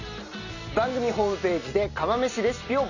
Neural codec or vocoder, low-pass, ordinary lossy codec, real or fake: none; 7.2 kHz; none; real